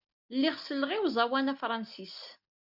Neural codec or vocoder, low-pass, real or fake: none; 5.4 kHz; real